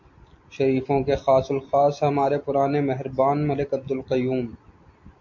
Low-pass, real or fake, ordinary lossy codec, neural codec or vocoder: 7.2 kHz; real; MP3, 48 kbps; none